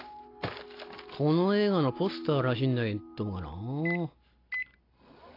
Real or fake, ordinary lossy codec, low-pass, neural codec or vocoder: real; AAC, 32 kbps; 5.4 kHz; none